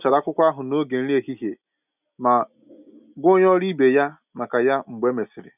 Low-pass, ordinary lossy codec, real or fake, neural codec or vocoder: 3.6 kHz; none; real; none